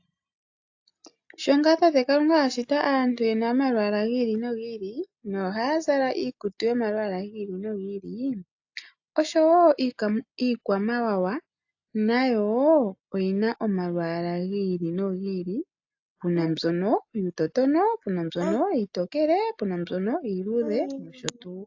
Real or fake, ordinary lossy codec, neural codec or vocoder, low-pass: real; AAC, 48 kbps; none; 7.2 kHz